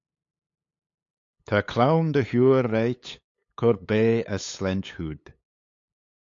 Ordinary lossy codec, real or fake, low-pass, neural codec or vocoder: AAC, 48 kbps; fake; 7.2 kHz; codec, 16 kHz, 8 kbps, FunCodec, trained on LibriTTS, 25 frames a second